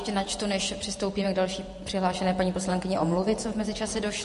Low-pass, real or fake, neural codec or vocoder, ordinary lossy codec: 14.4 kHz; fake; vocoder, 48 kHz, 128 mel bands, Vocos; MP3, 48 kbps